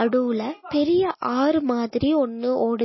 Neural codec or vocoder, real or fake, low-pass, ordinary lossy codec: none; real; 7.2 kHz; MP3, 24 kbps